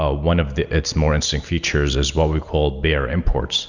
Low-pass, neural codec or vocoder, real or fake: 7.2 kHz; none; real